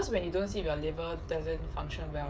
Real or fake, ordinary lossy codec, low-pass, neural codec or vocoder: fake; none; none; codec, 16 kHz, 16 kbps, FreqCodec, smaller model